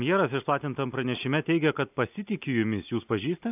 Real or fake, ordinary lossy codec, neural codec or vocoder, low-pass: real; AAC, 32 kbps; none; 3.6 kHz